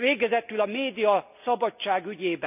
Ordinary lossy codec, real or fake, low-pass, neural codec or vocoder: none; real; 3.6 kHz; none